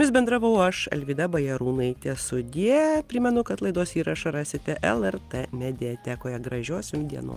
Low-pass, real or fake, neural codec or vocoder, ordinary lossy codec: 14.4 kHz; real; none; Opus, 24 kbps